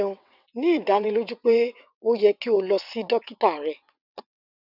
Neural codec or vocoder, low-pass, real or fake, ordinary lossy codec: vocoder, 22.05 kHz, 80 mel bands, WaveNeXt; 5.4 kHz; fake; none